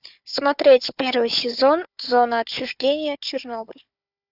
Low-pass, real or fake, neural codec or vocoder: 5.4 kHz; fake; codec, 16 kHz, 4 kbps, FunCodec, trained on Chinese and English, 50 frames a second